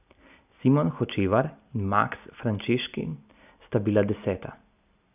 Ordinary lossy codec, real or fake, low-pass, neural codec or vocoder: none; real; 3.6 kHz; none